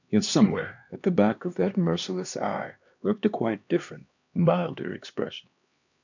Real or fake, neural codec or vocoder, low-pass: fake; codec, 16 kHz, 2 kbps, X-Codec, HuBERT features, trained on LibriSpeech; 7.2 kHz